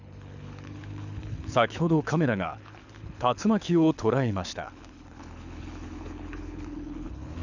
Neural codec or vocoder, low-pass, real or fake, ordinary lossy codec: codec, 24 kHz, 6 kbps, HILCodec; 7.2 kHz; fake; none